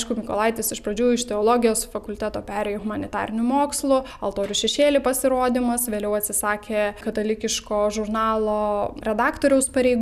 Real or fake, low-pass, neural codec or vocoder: real; 14.4 kHz; none